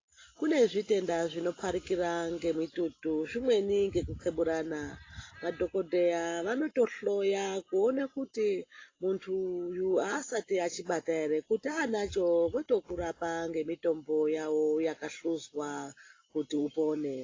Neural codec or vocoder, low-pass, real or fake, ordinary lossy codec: none; 7.2 kHz; real; AAC, 32 kbps